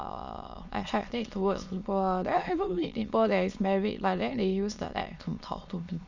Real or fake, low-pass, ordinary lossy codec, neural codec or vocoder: fake; 7.2 kHz; Opus, 64 kbps; autoencoder, 22.05 kHz, a latent of 192 numbers a frame, VITS, trained on many speakers